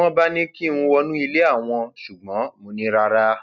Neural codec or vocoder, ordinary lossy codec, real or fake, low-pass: none; none; real; 7.2 kHz